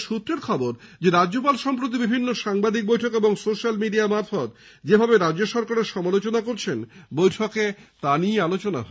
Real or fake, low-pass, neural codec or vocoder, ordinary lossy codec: real; none; none; none